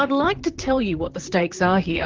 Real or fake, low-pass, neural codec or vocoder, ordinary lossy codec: fake; 7.2 kHz; vocoder, 44.1 kHz, 128 mel bands, Pupu-Vocoder; Opus, 16 kbps